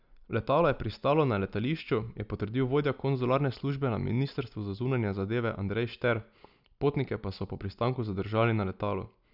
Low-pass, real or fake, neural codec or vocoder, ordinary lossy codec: 5.4 kHz; real; none; none